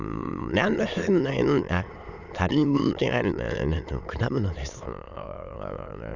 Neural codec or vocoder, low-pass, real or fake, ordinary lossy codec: autoencoder, 22.05 kHz, a latent of 192 numbers a frame, VITS, trained on many speakers; 7.2 kHz; fake; none